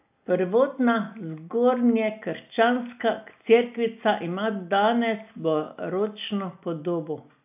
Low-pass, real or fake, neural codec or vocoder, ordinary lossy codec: 3.6 kHz; real; none; AAC, 32 kbps